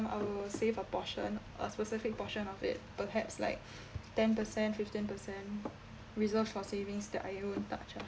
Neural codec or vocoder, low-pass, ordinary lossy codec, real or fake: none; none; none; real